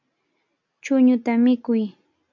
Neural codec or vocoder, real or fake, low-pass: none; real; 7.2 kHz